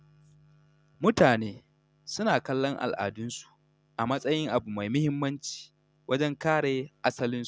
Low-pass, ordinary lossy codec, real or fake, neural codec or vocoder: none; none; real; none